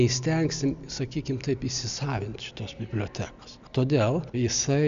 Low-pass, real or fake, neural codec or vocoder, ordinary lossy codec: 7.2 kHz; real; none; AAC, 96 kbps